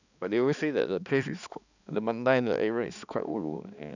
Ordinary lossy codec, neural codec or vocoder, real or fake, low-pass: none; codec, 16 kHz, 2 kbps, X-Codec, HuBERT features, trained on balanced general audio; fake; 7.2 kHz